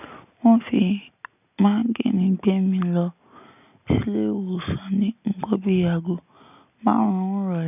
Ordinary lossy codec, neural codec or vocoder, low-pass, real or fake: none; none; 3.6 kHz; real